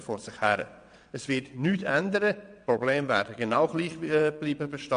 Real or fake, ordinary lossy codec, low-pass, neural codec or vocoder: fake; MP3, 64 kbps; 9.9 kHz; vocoder, 22.05 kHz, 80 mel bands, WaveNeXt